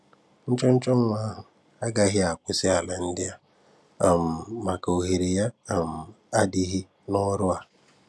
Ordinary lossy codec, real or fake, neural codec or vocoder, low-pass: none; real; none; none